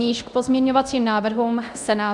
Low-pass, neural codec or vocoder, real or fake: 10.8 kHz; codec, 24 kHz, 0.9 kbps, DualCodec; fake